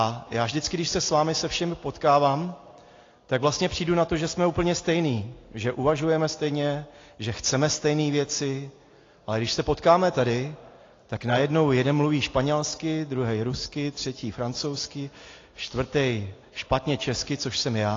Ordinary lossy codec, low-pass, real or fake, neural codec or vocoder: AAC, 32 kbps; 7.2 kHz; real; none